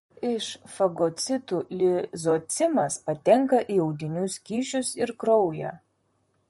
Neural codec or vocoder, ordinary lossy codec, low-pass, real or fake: vocoder, 44.1 kHz, 128 mel bands, Pupu-Vocoder; MP3, 48 kbps; 19.8 kHz; fake